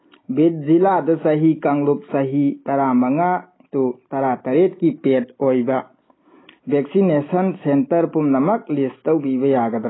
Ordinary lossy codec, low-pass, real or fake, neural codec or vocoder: AAC, 16 kbps; 7.2 kHz; real; none